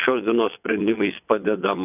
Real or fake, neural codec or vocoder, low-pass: fake; vocoder, 22.05 kHz, 80 mel bands, WaveNeXt; 3.6 kHz